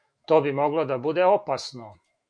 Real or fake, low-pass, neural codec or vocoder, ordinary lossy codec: fake; 9.9 kHz; autoencoder, 48 kHz, 128 numbers a frame, DAC-VAE, trained on Japanese speech; MP3, 64 kbps